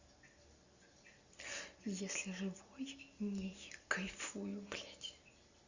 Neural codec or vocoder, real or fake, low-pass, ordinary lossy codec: none; real; 7.2 kHz; Opus, 64 kbps